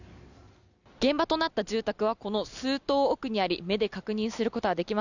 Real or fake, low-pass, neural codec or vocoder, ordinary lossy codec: real; 7.2 kHz; none; none